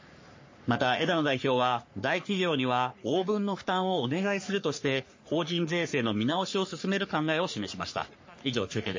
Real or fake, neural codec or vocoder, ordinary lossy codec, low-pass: fake; codec, 44.1 kHz, 3.4 kbps, Pupu-Codec; MP3, 32 kbps; 7.2 kHz